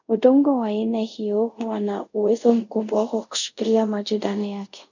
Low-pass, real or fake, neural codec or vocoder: 7.2 kHz; fake; codec, 24 kHz, 0.5 kbps, DualCodec